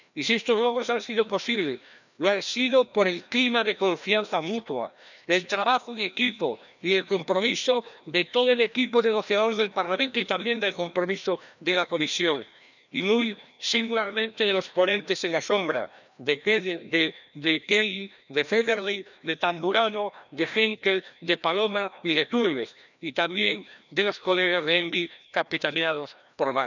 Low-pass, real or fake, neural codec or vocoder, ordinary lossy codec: 7.2 kHz; fake; codec, 16 kHz, 1 kbps, FreqCodec, larger model; none